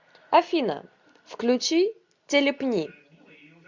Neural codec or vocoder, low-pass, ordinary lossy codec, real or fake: none; 7.2 kHz; MP3, 64 kbps; real